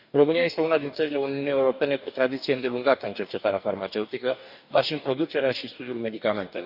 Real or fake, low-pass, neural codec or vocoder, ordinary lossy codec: fake; 5.4 kHz; codec, 44.1 kHz, 2.6 kbps, DAC; none